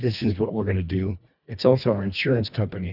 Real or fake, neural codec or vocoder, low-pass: fake; codec, 24 kHz, 1.5 kbps, HILCodec; 5.4 kHz